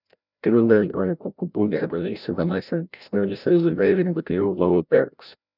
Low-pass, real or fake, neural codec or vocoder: 5.4 kHz; fake; codec, 16 kHz, 0.5 kbps, FreqCodec, larger model